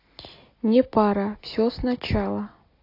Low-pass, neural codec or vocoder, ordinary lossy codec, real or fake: 5.4 kHz; none; AAC, 32 kbps; real